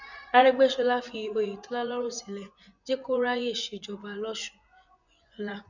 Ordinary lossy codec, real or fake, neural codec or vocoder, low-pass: none; fake; vocoder, 24 kHz, 100 mel bands, Vocos; 7.2 kHz